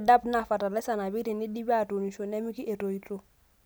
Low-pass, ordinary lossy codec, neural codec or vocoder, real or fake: none; none; none; real